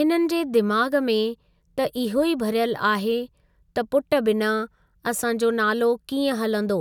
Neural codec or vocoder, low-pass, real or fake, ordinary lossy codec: none; 19.8 kHz; real; none